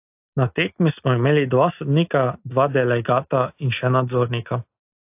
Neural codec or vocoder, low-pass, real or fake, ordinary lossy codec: none; 3.6 kHz; real; AAC, 32 kbps